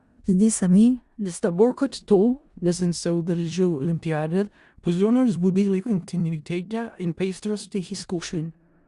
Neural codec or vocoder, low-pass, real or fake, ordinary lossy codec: codec, 16 kHz in and 24 kHz out, 0.4 kbps, LongCat-Audio-Codec, four codebook decoder; 10.8 kHz; fake; Opus, 64 kbps